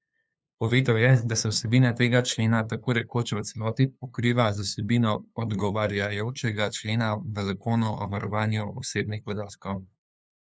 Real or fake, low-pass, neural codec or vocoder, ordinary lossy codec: fake; none; codec, 16 kHz, 2 kbps, FunCodec, trained on LibriTTS, 25 frames a second; none